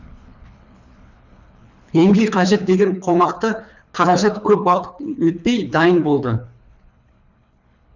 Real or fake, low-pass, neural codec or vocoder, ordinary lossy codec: fake; 7.2 kHz; codec, 24 kHz, 3 kbps, HILCodec; none